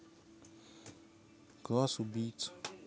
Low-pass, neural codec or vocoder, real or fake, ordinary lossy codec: none; none; real; none